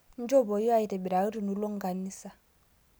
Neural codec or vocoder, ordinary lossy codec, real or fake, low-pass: none; none; real; none